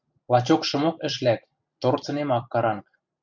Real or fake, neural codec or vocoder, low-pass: fake; vocoder, 44.1 kHz, 128 mel bands every 256 samples, BigVGAN v2; 7.2 kHz